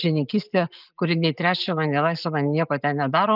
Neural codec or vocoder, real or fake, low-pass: none; real; 5.4 kHz